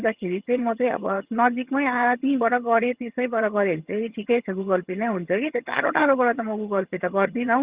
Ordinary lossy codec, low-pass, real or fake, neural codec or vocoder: Opus, 64 kbps; 3.6 kHz; fake; vocoder, 22.05 kHz, 80 mel bands, HiFi-GAN